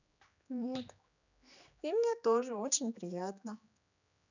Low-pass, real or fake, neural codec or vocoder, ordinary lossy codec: 7.2 kHz; fake; codec, 16 kHz, 2 kbps, X-Codec, HuBERT features, trained on general audio; none